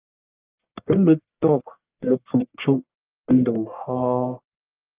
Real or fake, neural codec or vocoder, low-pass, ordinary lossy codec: fake; codec, 44.1 kHz, 1.7 kbps, Pupu-Codec; 3.6 kHz; Opus, 24 kbps